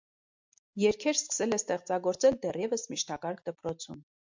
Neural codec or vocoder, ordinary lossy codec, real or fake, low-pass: none; MP3, 64 kbps; real; 7.2 kHz